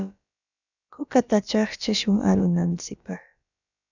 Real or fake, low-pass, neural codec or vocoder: fake; 7.2 kHz; codec, 16 kHz, about 1 kbps, DyCAST, with the encoder's durations